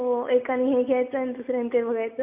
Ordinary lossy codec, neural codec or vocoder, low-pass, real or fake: AAC, 32 kbps; none; 3.6 kHz; real